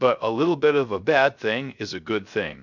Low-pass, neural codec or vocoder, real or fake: 7.2 kHz; codec, 16 kHz, 0.3 kbps, FocalCodec; fake